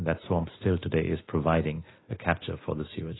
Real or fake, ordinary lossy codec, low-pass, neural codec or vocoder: real; AAC, 16 kbps; 7.2 kHz; none